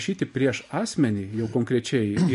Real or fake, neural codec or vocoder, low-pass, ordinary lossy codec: fake; vocoder, 44.1 kHz, 128 mel bands every 512 samples, BigVGAN v2; 14.4 kHz; MP3, 48 kbps